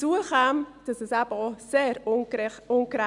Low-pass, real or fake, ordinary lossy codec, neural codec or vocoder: 10.8 kHz; real; none; none